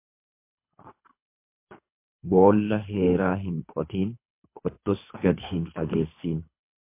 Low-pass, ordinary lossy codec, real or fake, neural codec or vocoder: 3.6 kHz; MP3, 24 kbps; fake; codec, 24 kHz, 3 kbps, HILCodec